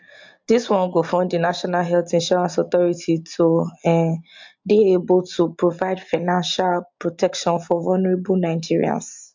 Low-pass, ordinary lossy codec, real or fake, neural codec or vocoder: 7.2 kHz; MP3, 64 kbps; real; none